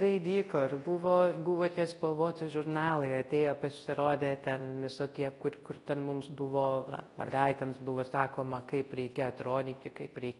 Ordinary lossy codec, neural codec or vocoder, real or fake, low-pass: AAC, 32 kbps; codec, 24 kHz, 0.9 kbps, WavTokenizer, large speech release; fake; 10.8 kHz